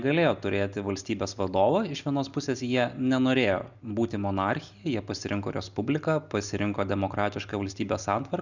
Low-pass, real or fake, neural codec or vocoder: 7.2 kHz; real; none